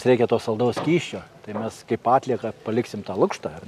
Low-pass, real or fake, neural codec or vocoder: 14.4 kHz; real; none